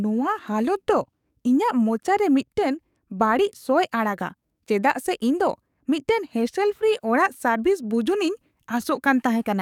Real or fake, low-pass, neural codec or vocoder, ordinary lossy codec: fake; 19.8 kHz; codec, 44.1 kHz, 7.8 kbps, DAC; none